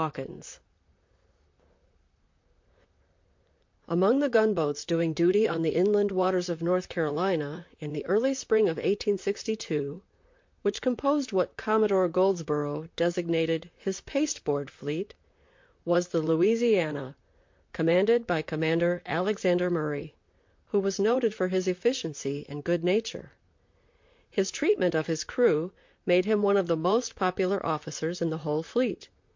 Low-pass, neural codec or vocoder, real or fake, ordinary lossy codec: 7.2 kHz; vocoder, 44.1 kHz, 128 mel bands, Pupu-Vocoder; fake; MP3, 48 kbps